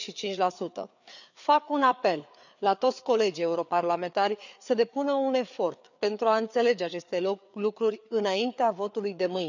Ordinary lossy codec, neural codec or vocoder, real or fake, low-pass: none; codec, 16 kHz, 4 kbps, FreqCodec, larger model; fake; 7.2 kHz